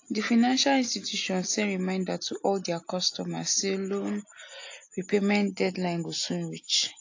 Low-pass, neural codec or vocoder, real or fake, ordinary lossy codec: 7.2 kHz; none; real; AAC, 48 kbps